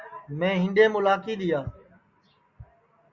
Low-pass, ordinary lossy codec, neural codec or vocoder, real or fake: 7.2 kHz; Opus, 64 kbps; none; real